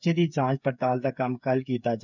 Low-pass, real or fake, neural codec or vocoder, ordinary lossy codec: 7.2 kHz; fake; codec, 16 kHz, 8 kbps, FreqCodec, smaller model; none